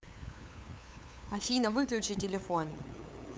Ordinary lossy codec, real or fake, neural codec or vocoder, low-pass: none; fake; codec, 16 kHz, 8 kbps, FunCodec, trained on LibriTTS, 25 frames a second; none